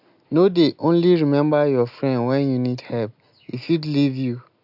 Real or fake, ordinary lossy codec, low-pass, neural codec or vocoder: real; AAC, 48 kbps; 5.4 kHz; none